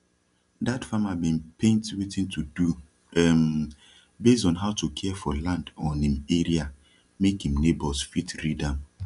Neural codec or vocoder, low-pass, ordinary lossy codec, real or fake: none; 10.8 kHz; none; real